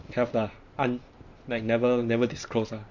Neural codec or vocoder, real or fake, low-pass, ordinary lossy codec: vocoder, 44.1 kHz, 128 mel bands every 512 samples, BigVGAN v2; fake; 7.2 kHz; none